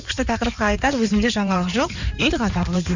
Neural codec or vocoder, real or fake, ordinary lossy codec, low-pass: codec, 16 kHz in and 24 kHz out, 2.2 kbps, FireRedTTS-2 codec; fake; none; 7.2 kHz